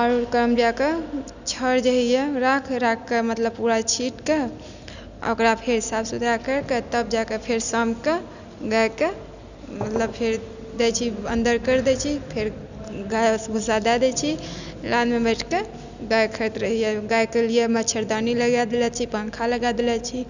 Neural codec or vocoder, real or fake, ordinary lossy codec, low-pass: none; real; none; 7.2 kHz